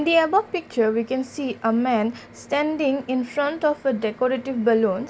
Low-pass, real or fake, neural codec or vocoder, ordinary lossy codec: none; real; none; none